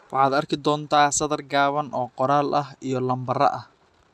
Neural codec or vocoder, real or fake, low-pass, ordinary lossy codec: none; real; none; none